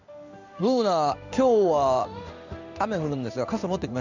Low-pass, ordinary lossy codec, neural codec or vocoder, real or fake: 7.2 kHz; none; codec, 16 kHz in and 24 kHz out, 1 kbps, XY-Tokenizer; fake